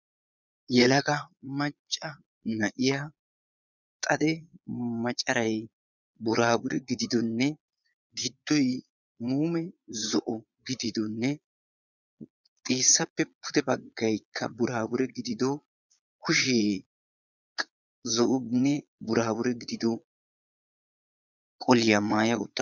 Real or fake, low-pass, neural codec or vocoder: fake; 7.2 kHz; vocoder, 22.05 kHz, 80 mel bands, Vocos